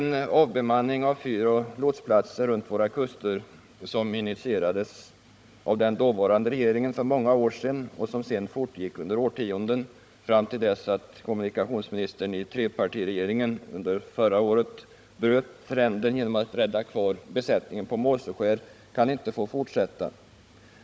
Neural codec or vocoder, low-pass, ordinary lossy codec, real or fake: codec, 16 kHz, 16 kbps, FunCodec, trained on Chinese and English, 50 frames a second; none; none; fake